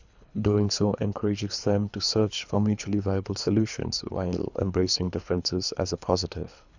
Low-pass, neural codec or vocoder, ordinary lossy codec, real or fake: 7.2 kHz; codec, 24 kHz, 3 kbps, HILCodec; none; fake